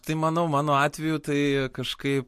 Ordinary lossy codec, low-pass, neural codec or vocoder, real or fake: MP3, 64 kbps; 14.4 kHz; none; real